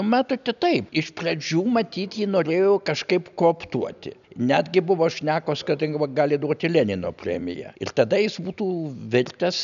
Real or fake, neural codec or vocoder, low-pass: real; none; 7.2 kHz